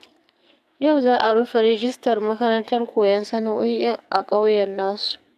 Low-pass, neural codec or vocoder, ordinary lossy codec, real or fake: 14.4 kHz; codec, 44.1 kHz, 2.6 kbps, SNAC; none; fake